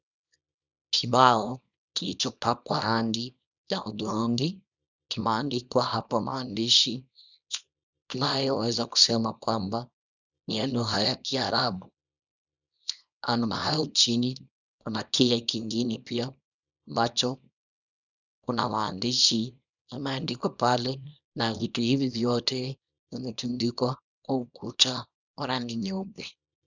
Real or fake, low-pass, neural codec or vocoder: fake; 7.2 kHz; codec, 24 kHz, 0.9 kbps, WavTokenizer, small release